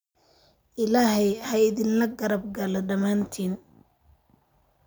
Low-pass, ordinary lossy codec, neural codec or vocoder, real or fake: none; none; none; real